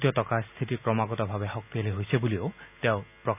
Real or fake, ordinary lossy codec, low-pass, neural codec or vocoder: real; none; 3.6 kHz; none